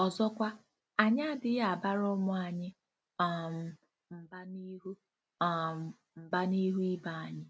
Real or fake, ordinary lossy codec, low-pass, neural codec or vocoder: real; none; none; none